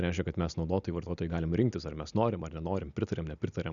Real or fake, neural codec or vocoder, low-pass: real; none; 7.2 kHz